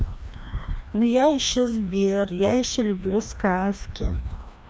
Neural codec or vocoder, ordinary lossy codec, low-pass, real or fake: codec, 16 kHz, 1 kbps, FreqCodec, larger model; none; none; fake